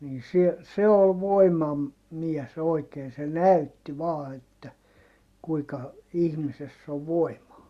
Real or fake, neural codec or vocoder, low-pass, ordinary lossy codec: real; none; 14.4 kHz; Opus, 64 kbps